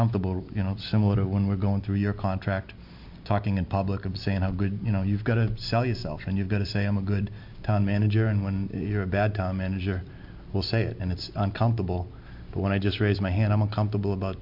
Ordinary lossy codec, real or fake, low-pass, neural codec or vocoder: MP3, 48 kbps; real; 5.4 kHz; none